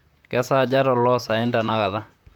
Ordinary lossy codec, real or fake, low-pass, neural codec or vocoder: MP3, 96 kbps; fake; 19.8 kHz; vocoder, 44.1 kHz, 128 mel bands, Pupu-Vocoder